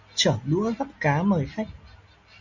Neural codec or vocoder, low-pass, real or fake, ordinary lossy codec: none; 7.2 kHz; real; Opus, 64 kbps